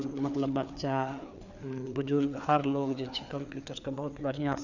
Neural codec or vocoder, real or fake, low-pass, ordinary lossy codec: codec, 16 kHz, 4 kbps, FreqCodec, larger model; fake; 7.2 kHz; none